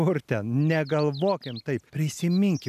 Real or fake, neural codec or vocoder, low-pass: real; none; 14.4 kHz